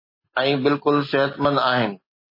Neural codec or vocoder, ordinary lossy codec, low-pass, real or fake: none; MP3, 24 kbps; 5.4 kHz; real